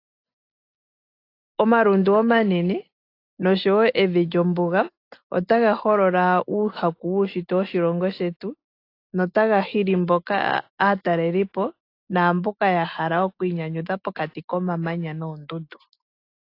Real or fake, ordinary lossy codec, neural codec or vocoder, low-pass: real; AAC, 32 kbps; none; 5.4 kHz